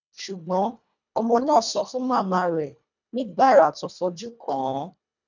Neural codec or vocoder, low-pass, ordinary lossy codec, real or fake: codec, 24 kHz, 1.5 kbps, HILCodec; 7.2 kHz; none; fake